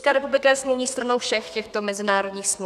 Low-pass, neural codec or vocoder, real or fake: 14.4 kHz; codec, 32 kHz, 1.9 kbps, SNAC; fake